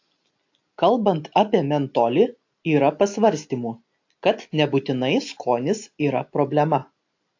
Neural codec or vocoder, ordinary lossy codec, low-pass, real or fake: none; AAC, 48 kbps; 7.2 kHz; real